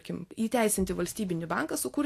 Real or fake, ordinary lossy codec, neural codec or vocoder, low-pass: real; AAC, 64 kbps; none; 14.4 kHz